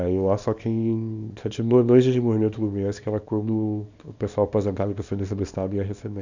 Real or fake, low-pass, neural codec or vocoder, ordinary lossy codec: fake; 7.2 kHz; codec, 24 kHz, 0.9 kbps, WavTokenizer, small release; none